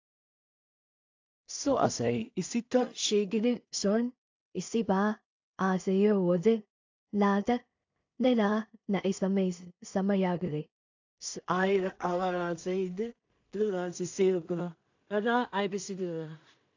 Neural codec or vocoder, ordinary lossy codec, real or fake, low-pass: codec, 16 kHz in and 24 kHz out, 0.4 kbps, LongCat-Audio-Codec, two codebook decoder; AAC, 48 kbps; fake; 7.2 kHz